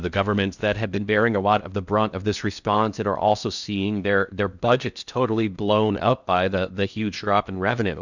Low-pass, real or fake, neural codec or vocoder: 7.2 kHz; fake; codec, 16 kHz in and 24 kHz out, 0.6 kbps, FocalCodec, streaming, 2048 codes